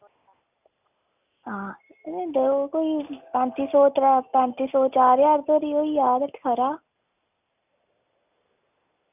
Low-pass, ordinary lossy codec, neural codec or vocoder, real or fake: 3.6 kHz; none; none; real